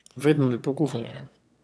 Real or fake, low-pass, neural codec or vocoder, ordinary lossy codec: fake; none; autoencoder, 22.05 kHz, a latent of 192 numbers a frame, VITS, trained on one speaker; none